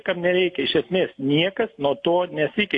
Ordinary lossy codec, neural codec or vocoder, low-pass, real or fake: AAC, 48 kbps; none; 10.8 kHz; real